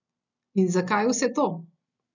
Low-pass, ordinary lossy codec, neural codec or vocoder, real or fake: 7.2 kHz; none; none; real